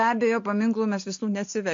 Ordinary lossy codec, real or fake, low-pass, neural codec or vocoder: MP3, 48 kbps; real; 7.2 kHz; none